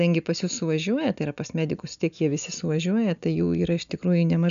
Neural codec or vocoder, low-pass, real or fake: none; 7.2 kHz; real